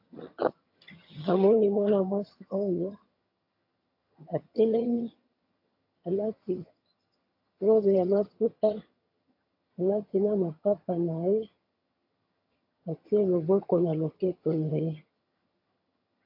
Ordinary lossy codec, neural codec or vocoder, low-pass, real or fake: AAC, 32 kbps; vocoder, 22.05 kHz, 80 mel bands, HiFi-GAN; 5.4 kHz; fake